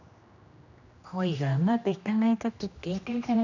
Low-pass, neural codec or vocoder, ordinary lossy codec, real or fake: 7.2 kHz; codec, 16 kHz, 1 kbps, X-Codec, HuBERT features, trained on general audio; none; fake